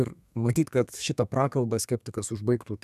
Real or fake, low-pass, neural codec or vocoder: fake; 14.4 kHz; codec, 32 kHz, 1.9 kbps, SNAC